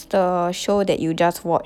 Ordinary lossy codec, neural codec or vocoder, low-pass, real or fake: none; none; 19.8 kHz; real